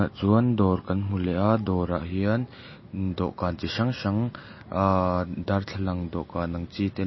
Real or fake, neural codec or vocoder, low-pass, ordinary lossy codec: real; none; 7.2 kHz; MP3, 24 kbps